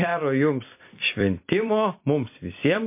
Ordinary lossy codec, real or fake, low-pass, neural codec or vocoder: MP3, 24 kbps; fake; 3.6 kHz; vocoder, 44.1 kHz, 128 mel bands every 256 samples, BigVGAN v2